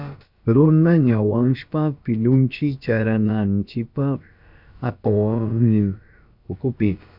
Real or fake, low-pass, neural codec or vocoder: fake; 5.4 kHz; codec, 16 kHz, about 1 kbps, DyCAST, with the encoder's durations